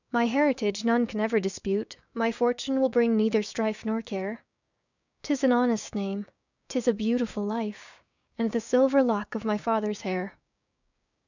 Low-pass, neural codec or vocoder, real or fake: 7.2 kHz; codec, 16 kHz, 6 kbps, DAC; fake